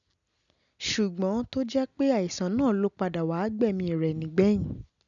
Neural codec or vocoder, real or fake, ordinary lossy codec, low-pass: none; real; none; 7.2 kHz